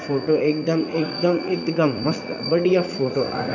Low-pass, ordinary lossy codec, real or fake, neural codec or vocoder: 7.2 kHz; none; fake; vocoder, 44.1 kHz, 80 mel bands, Vocos